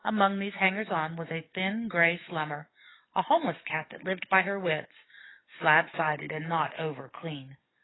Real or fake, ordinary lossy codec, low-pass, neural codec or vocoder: fake; AAC, 16 kbps; 7.2 kHz; codec, 44.1 kHz, 7.8 kbps, DAC